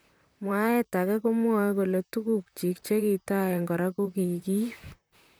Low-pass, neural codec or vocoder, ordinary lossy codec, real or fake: none; vocoder, 44.1 kHz, 128 mel bands, Pupu-Vocoder; none; fake